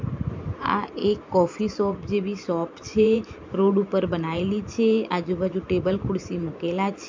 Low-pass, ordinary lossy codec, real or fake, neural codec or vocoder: 7.2 kHz; MP3, 64 kbps; fake; vocoder, 44.1 kHz, 128 mel bands every 512 samples, BigVGAN v2